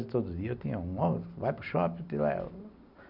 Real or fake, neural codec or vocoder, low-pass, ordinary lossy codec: real; none; 5.4 kHz; none